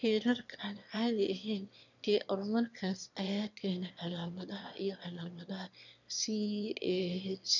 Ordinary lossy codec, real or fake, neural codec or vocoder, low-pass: none; fake; autoencoder, 22.05 kHz, a latent of 192 numbers a frame, VITS, trained on one speaker; 7.2 kHz